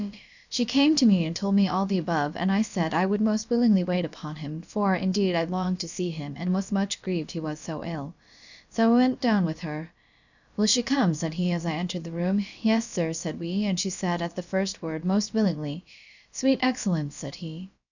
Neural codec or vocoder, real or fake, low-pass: codec, 16 kHz, about 1 kbps, DyCAST, with the encoder's durations; fake; 7.2 kHz